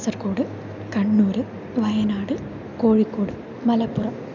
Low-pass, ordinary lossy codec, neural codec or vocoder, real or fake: 7.2 kHz; none; none; real